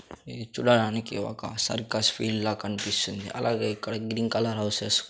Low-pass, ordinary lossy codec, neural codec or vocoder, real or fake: none; none; none; real